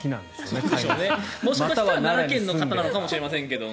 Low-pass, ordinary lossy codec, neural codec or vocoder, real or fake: none; none; none; real